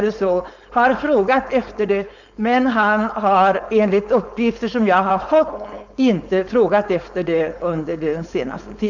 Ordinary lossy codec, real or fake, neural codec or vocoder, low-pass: none; fake; codec, 16 kHz, 4.8 kbps, FACodec; 7.2 kHz